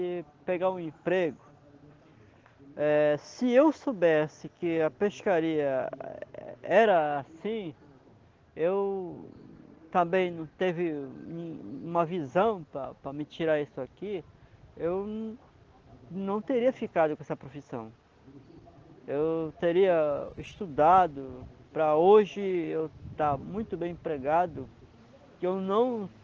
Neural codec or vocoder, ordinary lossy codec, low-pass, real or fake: none; Opus, 16 kbps; 7.2 kHz; real